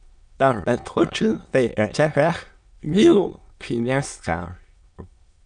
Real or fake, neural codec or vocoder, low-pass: fake; autoencoder, 22.05 kHz, a latent of 192 numbers a frame, VITS, trained on many speakers; 9.9 kHz